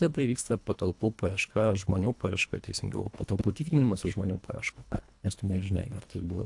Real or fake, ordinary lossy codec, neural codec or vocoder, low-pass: fake; AAC, 64 kbps; codec, 24 kHz, 1.5 kbps, HILCodec; 10.8 kHz